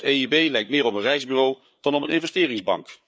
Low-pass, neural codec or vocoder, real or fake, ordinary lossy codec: none; codec, 16 kHz, 4 kbps, FreqCodec, larger model; fake; none